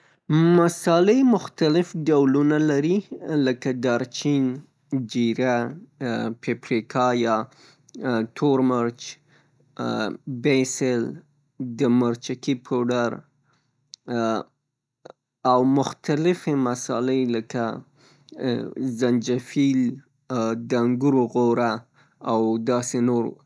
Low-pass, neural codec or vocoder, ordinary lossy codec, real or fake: none; none; none; real